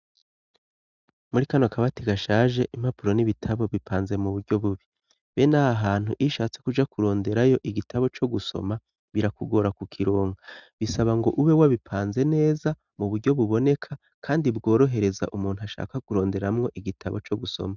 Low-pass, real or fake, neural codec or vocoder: 7.2 kHz; real; none